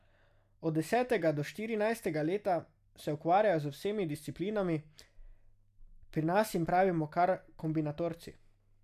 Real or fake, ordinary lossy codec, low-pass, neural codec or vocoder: real; none; 14.4 kHz; none